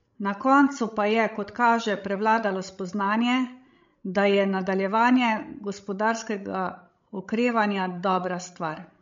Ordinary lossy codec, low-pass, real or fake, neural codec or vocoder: MP3, 48 kbps; 7.2 kHz; fake; codec, 16 kHz, 16 kbps, FreqCodec, larger model